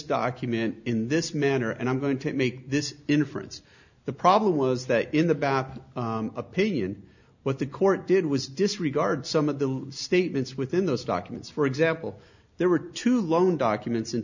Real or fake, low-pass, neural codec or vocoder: real; 7.2 kHz; none